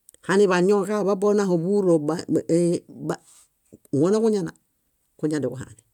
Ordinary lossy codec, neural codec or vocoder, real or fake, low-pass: none; none; real; 19.8 kHz